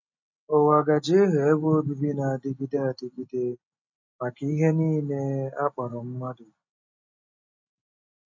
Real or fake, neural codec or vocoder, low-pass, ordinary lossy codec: real; none; 7.2 kHz; MP3, 48 kbps